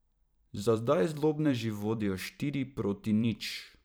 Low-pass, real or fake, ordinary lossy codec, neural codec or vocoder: none; fake; none; vocoder, 44.1 kHz, 128 mel bands every 512 samples, BigVGAN v2